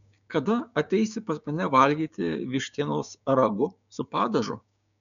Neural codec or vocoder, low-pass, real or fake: codec, 16 kHz, 6 kbps, DAC; 7.2 kHz; fake